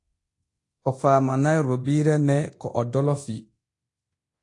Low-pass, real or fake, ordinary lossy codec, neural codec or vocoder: 10.8 kHz; fake; AAC, 48 kbps; codec, 24 kHz, 0.9 kbps, DualCodec